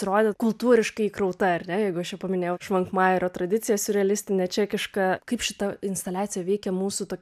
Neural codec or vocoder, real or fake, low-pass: none; real; 14.4 kHz